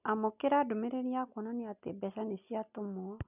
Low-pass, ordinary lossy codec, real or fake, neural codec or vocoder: 3.6 kHz; none; real; none